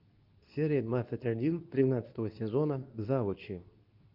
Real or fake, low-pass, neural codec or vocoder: fake; 5.4 kHz; codec, 24 kHz, 0.9 kbps, WavTokenizer, medium speech release version 2